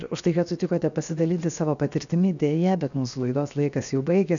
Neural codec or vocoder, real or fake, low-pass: codec, 16 kHz, 0.7 kbps, FocalCodec; fake; 7.2 kHz